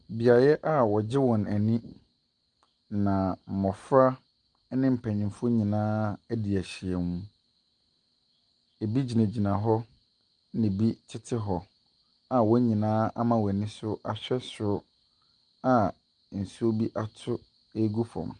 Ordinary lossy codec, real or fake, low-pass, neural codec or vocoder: Opus, 32 kbps; real; 9.9 kHz; none